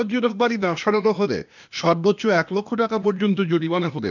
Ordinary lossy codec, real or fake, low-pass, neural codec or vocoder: none; fake; 7.2 kHz; codec, 16 kHz, 0.8 kbps, ZipCodec